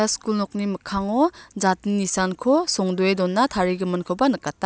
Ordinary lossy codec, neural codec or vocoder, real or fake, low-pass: none; none; real; none